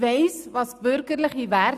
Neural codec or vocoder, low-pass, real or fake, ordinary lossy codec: none; 14.4 kHz; real; none